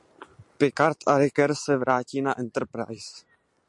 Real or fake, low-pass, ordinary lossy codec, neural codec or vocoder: real; 10.8 kHz; MP3, 64 kbps; none